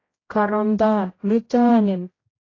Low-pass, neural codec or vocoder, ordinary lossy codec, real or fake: 7.2 kHz; codec, 16 kHz, 0.5 kbps, X-Codec, HuBERT features, trained on general audio; AAC, 32 kbps; fake